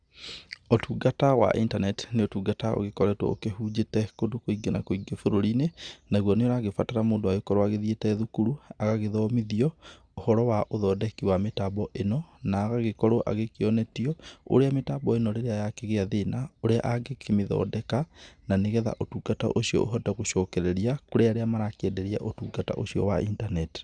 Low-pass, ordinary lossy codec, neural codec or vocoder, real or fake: 9.9 kHz; Opus, 64 kbps; none; real